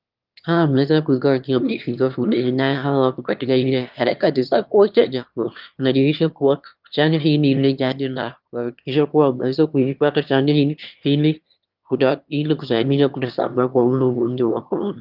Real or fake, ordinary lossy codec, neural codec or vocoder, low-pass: fake; Opus, 32 kbps; autoencoder, 22.05 kHz, a latent of 192 numbers a frame, VITS, trained on one speaker; 5.4 kHz